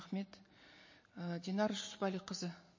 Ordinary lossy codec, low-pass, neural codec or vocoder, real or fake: MP3, 32 kbps; 7.2 kHz; none; real